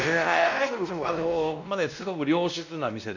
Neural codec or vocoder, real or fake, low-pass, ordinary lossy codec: codec, 16 kHz, 1 kbps, X-Codec, WavLM features, trained on Multilingual LibriSpeech; fake; 7.2 kHz; none